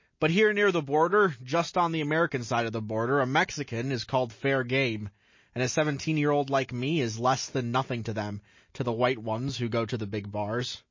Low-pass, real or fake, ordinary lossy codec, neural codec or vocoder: 7.2 kHz; real; MP3, 32 kbps; none